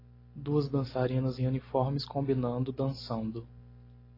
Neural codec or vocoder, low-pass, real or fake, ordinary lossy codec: none; 5.4 kHz; real; AAC, 24 kbps